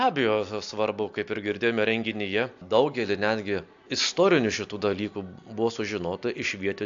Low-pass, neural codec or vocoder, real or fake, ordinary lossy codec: 7.2 kHz; none; real; AAC, 64 kbps